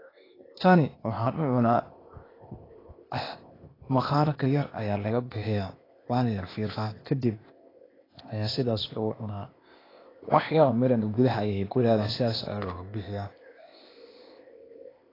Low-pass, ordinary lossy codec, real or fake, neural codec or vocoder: 5.4 kHz; AAC, 24 kbps; fake; codec, 16 kHz, 0.8 kbps, ZipCodec